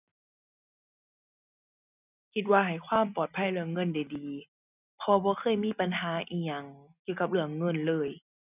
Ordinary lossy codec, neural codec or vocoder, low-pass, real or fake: none; none; 3.6 kHz; real